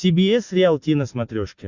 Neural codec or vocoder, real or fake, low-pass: none; real; 7.2 kHz